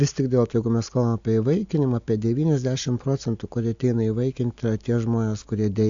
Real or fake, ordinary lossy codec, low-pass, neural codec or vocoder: real; AAC, 64 kbps; 7.2 kHz; none